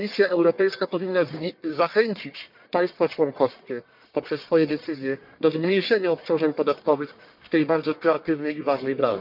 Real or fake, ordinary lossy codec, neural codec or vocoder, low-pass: fake; MP3, 48 kbps; codec, 44.1 kHz, 1.7 kbps, Pupu-Codec; 5.4 kHz